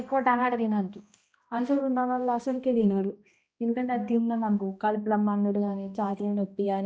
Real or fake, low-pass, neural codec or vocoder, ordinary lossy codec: fake; none; codec, 16 kHz, 1 kbps, X-Codec, HuBERT features, trained on balanced general audio; none